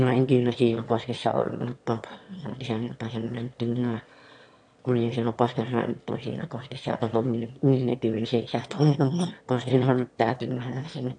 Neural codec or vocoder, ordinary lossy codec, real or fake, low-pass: autoencoder, 22.05 kHz, a latent of 192 numbers a frame, VITS, trained on one speaker; AAC, 64 kbps; fake; 9.9 kHz